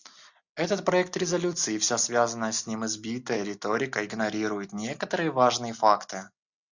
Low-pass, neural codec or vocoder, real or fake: 7.2 kHz; none; real